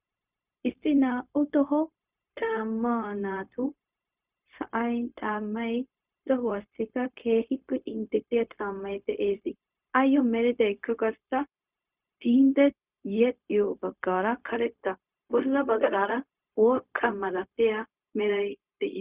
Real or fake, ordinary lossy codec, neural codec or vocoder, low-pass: fake; Opus, 64 kbps; codec, 16 kHz, 0.4 kbps, LongCat-Audio-Codec; 3.6 kHz